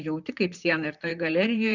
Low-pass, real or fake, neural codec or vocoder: 7.2 kHz; fake; vocoder, 44.1 kHz, 128 mel bands, Pupu-Vocoder